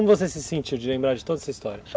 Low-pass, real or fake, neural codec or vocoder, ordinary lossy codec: none; real; none; none